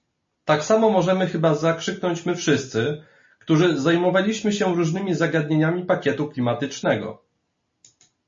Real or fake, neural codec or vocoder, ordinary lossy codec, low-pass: real; none; MP3, 32 kbps; 7.2 kHz